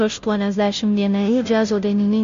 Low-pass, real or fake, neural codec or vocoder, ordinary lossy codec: 7.2 kHz; fake; codec, 16 kHz, 0.5 kbps, FunCodec, trained on Chinese and English, 25 frames a second; MP3, 48 kbps